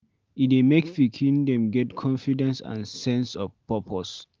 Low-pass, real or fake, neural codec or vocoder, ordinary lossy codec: 7.2 kHz; real; none; Opus, 24 kbps